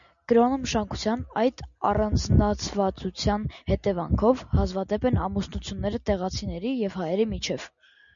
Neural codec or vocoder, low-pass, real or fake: none; 7.2 kHz; real